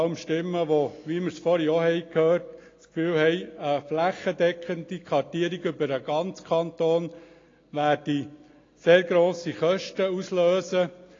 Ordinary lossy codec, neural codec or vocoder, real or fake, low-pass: AAC, 32 kbps; none; real; 7.2 kHz